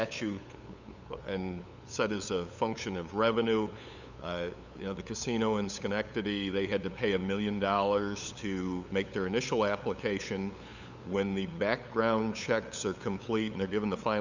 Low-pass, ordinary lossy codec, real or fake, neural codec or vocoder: 7.2 kHz; Opus, 64 kbps; fake; codec, 16 kHz, 8 kbps, FunCodec, trained on LibriTTS, 25 frames a second